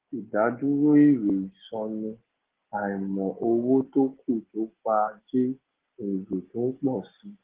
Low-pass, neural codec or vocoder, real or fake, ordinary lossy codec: 3.6 kHz; none; real; Opus, 16 kbps